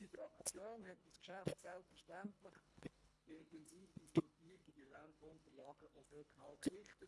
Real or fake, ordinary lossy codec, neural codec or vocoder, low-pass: fake; none; codec, 24 kHz, 1.5 kbps, HILCodec; none